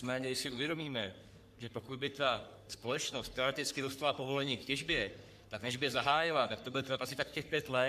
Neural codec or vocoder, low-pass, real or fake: codec, 44.1 kHz, 3.4 kbps, Pupu-Codec; 14.4 kHz; fake